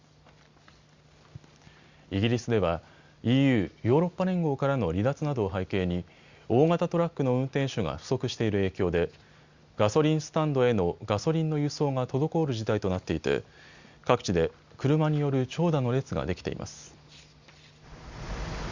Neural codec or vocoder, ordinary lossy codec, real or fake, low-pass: none; Opus, 64 kbps; real; 7.2 kHz